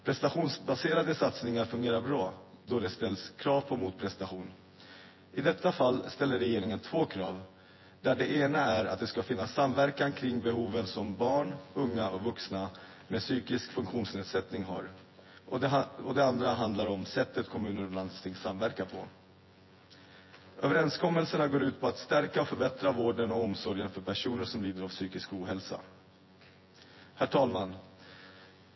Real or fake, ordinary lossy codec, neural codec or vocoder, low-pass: fake; MP3, 24 kbps; vocoder, 24 kHz, 100 mel bands, Vocos; 7.2 kHz